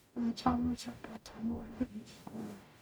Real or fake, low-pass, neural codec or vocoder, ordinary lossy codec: fake; none; codec, 44.1 kHz, 0.9 kbps, DAC; none